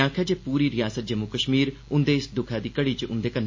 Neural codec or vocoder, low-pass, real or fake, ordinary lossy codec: none; 7.2 kHz; real; MP3, 32 kbps